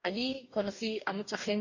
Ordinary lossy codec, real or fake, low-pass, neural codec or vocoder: none; fake; 7.2 kHz; codec, 44.1 kHz, 2.6 kbps, DAC